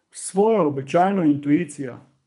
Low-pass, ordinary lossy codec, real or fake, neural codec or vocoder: 10.8 kHz; none; fake; codec, 24 kHz, 3 kbps, HILCodec